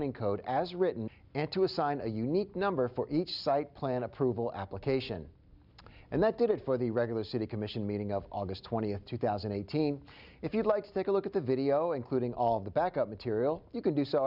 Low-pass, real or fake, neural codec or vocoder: 5.4 kHz; real; none